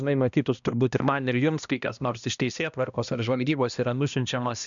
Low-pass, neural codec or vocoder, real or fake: 7.2 kHz; codec, 16 kHz, 1 kbps, X-Codec, HuBERT features, trained on balanced general audio; fake